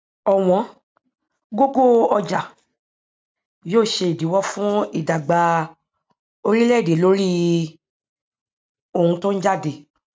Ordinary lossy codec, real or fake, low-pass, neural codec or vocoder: none; real; none; none